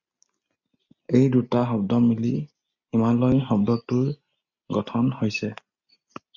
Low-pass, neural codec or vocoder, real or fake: 7.2 kHz; none; real